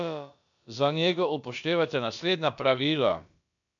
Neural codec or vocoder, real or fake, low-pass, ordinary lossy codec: codec, 16 kHz, about 1 kbps, DyCAST, with the encoder's durations; fake; 7.2 kHz; none